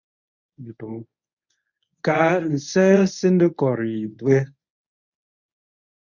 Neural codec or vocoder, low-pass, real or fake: codec, 24 kHz, 0.9 kbps, WavTokenizer, medium speech release version 1; 7.2 kHz; fake